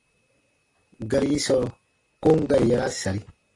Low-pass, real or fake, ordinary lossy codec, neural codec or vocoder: 10.8 kHz; real; AAC, 32 kbps; none